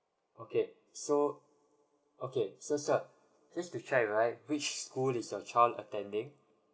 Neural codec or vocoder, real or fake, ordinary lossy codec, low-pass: none; real; none; none